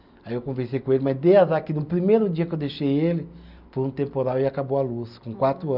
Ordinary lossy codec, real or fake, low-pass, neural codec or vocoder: none; real; 5.4 kHz; none